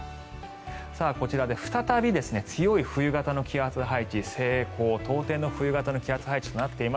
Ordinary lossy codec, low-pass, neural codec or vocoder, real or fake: none; none; none; real